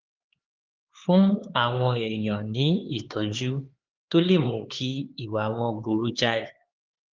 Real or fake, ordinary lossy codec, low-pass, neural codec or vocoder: fake; Opus, 16 kbps; 7.2 kHz; codec, 16 kHz, 4 kbps, X-Codec, HuBERT features, trained on LibriSpeech